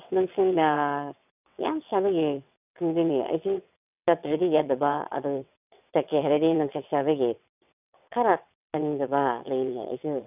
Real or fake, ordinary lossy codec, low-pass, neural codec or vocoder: fake; none; 3.6 kHz; vocoder, 22.05 kHz, 80 mel bands, WaveNeXt